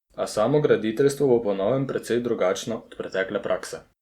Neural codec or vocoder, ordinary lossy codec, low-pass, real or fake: none; Opus, 64 kbps; 19.8 kHz; real